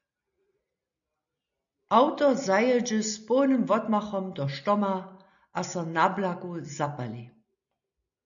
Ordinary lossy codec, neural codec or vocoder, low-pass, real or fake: MP3, 96 kbps; none; 7.2 kHz; real